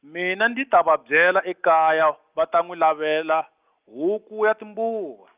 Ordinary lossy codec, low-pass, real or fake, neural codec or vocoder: Opus, 24 kbps; 3.6 kHz; real; none